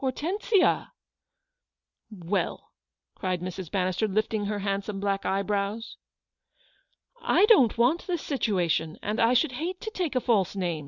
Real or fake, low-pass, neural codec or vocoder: real; 7.2 kHz; none